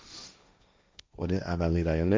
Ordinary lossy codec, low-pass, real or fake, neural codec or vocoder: none; none; fake; codec, 16 kHz, 1.1 kbps, Voila-Tokenizer